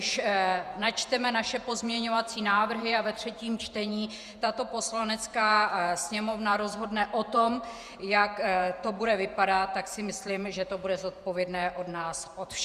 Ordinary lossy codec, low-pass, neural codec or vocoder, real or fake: Opus, 64 kbps; 14.4 kHz; vocoder, 48 kHz, 128 mel bands, Vocos; fake